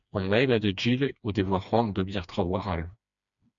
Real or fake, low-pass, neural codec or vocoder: fake; 7.2 kHz; codec, 16 kHz, 2 kbps, FreqCodec, smaller model